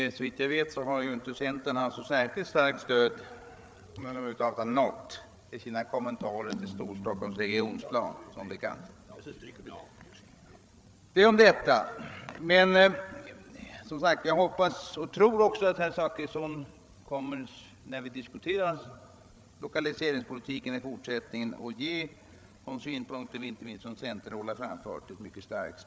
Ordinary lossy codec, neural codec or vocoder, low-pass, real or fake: none; codec, 16 kHz, 8 kbps, FreqCodec, larger model; none; fake